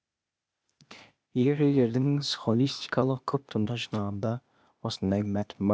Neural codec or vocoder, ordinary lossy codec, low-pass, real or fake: codec, 16 kHz, 0.8 kbps, ZipCodec; none; none; fake